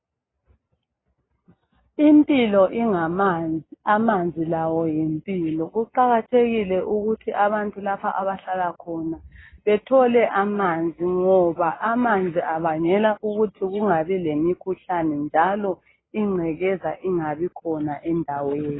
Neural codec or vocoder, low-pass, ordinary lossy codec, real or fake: none; 7.2 kHz; AAC, 16 kbps; real